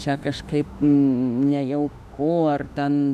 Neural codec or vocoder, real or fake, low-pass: autoencoder, 48 kHz, 32 numbers a frame, DAC-VAE, trained on Japanese speech; fake; 14.4 kHz